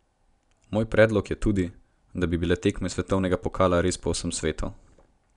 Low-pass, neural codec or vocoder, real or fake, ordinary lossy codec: 10.8 kHz; none; real; none